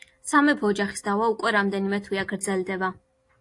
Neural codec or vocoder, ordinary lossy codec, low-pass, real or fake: none; AAC, 48 kbps; 10.8 kHz; real